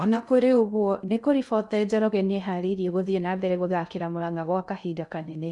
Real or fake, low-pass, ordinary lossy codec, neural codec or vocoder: fake; 10.8 kHz; none; codec, 16 kHz in and 24 kHz out, 0.6 kbps, FocalCodec, streaming, 2048 codes